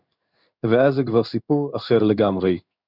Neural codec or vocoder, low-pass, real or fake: codec, 16 kHz in and 24 kHz out, 1 kbps, XY-Tokenizer; 5.4 kHz; fake